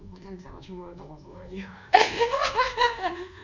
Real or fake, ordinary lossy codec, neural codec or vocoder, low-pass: fake; none; codec, 24 kHz, 1.2 kbps, DualCodec; 7.2 kHz